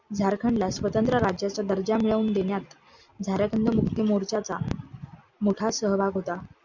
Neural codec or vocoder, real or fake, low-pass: none; real; 7.2 kHz